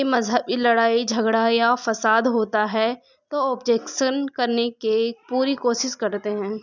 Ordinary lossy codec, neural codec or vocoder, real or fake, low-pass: none; none; real; 7.2 kHz